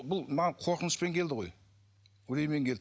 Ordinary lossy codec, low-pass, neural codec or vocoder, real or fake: none; none; none; real